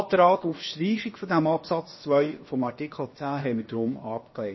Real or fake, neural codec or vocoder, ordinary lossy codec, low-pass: fake; codec, 16 kHz, about 1 kbps, DyCAST, with the encoder's durations; MP3, 24 kbps; 7.2 kHz